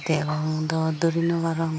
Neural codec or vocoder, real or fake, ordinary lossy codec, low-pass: none; real; none; none